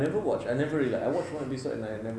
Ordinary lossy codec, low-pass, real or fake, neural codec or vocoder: none; none; real; none